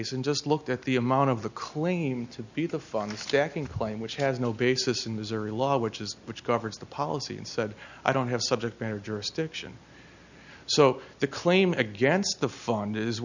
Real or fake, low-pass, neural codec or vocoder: real; 7.2 kHz; none